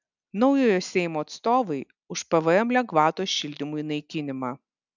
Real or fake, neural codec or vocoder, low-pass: real; none; 7.2 kHz